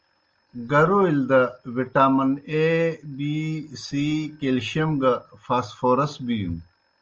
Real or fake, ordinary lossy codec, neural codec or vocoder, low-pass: real; Opus, 24 kbps; none; 7.2 kHz